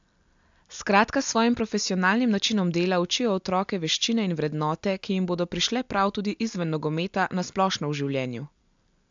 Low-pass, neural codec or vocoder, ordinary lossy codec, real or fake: 7.2 kHz; none; AAC, 64 kbps; real